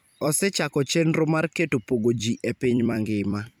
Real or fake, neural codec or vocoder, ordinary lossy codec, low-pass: fake; vocoder, 44.1 kHz, 128 mel bands every 256 samples, BigVGAN v2; none; none